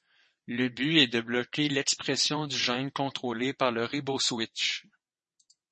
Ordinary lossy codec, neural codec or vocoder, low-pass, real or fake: MP3, 32 kbps; vocoder, 22.05 kHz, 80 mel bands, WaveNeXt; 9.9 kHz; fake